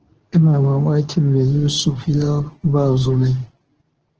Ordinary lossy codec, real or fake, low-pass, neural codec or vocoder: Opus, 16 kbps; fake; 7.2 kHz; codec, 24 kHz, 0.9 kbps, WavTokenizer, medium speech release version 2